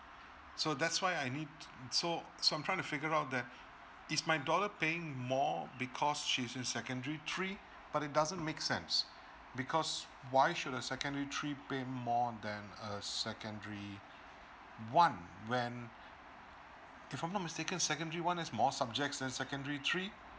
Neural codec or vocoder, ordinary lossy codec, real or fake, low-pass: none; none; real; none